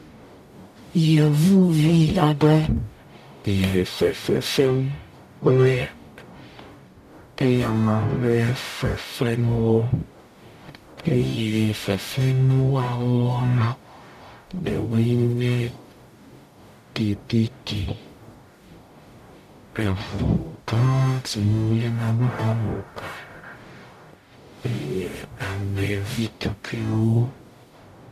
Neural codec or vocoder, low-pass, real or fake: codec, 44.1 kHz, 0.9 kbps, DAC; 14.4 kHz; fake